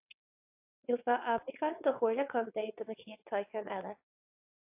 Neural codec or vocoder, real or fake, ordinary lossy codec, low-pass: vocoder, 22.05 kHz, 80 mel bands, WaveNeXt; fake; AAC, 32 kbps; 3.6 kHz